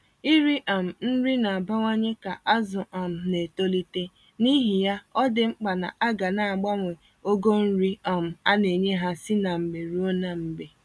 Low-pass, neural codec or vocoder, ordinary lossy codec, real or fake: none; none; none; real